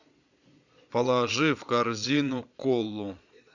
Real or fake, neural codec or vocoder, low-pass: fake; vocoder, 22.05 kHz, 80 mel bands, Vocos; 7.2 kHz